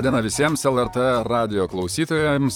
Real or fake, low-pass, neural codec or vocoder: fake; 19.8 kHz; vocoder, 44.1 kHz, 128 mel bands, Pupu-Vocoder